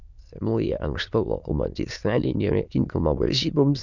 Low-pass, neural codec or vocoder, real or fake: 7.2 kHz; autoencoder, 22.05 kHz, a latent of 192 numbers a frame, VITS, trained on many speakers; fake